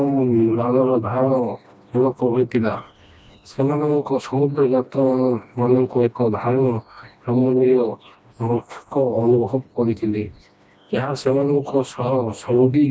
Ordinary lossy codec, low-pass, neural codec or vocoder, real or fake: none; none; codec, 16 kHz, 1 kbps, FreqCodec, smaller model; fake